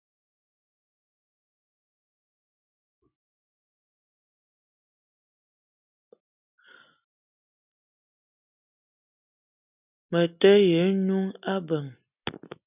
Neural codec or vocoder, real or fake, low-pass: none; real; 3.6 kHz